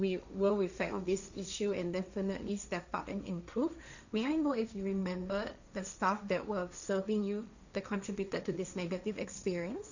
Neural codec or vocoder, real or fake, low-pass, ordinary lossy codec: codec, 16 kHz, 1.1 kbps, Voila-Tokenizer; fake; 7.2 kHz; none